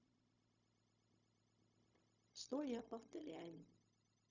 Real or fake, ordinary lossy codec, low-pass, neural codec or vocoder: fake; none; 7.2 kHz; codec, 16 kHz, 0.4 kbps, LongCat-Audio-Codec